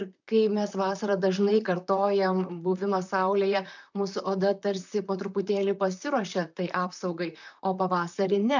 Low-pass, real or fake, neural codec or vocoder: 7.2 kHz; fake; vocoder, 44.1 kHz, 128 mel bands, Pupu-Vocoder